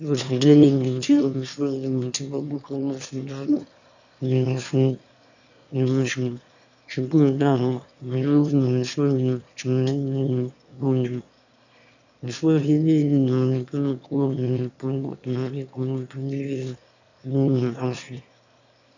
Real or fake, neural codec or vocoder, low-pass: fake; autoencoder, 22.05 kHz, a latent of 192 numbers a frame, VITS, trained on one speaker; 7.2 kHz